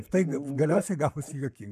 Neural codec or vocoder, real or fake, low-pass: codec, 44.1 kHz, 7.8 kbps, Pupu-Codec; fake; 14.4 kHz